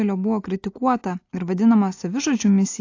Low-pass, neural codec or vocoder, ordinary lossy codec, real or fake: 7.2 kHz; none; AAC, 48 kbps; real